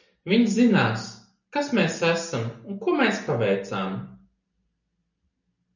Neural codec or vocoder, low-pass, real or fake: none; 7.2 kHz; real